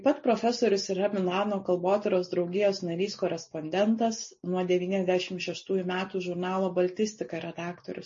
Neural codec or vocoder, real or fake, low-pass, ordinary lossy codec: none; real; 7.2 kHz; MP3, 32 kbps